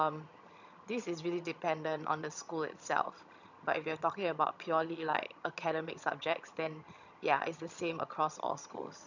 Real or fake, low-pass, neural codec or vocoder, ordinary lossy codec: fake; 7.2 kHz; vocoder, 22.05 kHz, 80 mel bands, HiFi-GAN; none